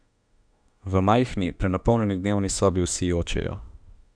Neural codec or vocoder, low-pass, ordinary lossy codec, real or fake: autoencoder, 48 kHz, 32 numbers a frame, DAC-VAE, trained on Japanese speech; 9.9 kHz; none; fake